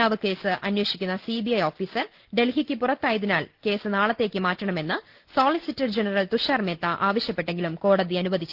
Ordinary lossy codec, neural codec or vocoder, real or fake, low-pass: Opus, 16 kbps; none; real; 5.4 kHz